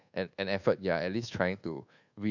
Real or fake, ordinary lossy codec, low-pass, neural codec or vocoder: fake; none; 7.2 kHz; codec, 24 kHz, 1.2 kbps, DualCodec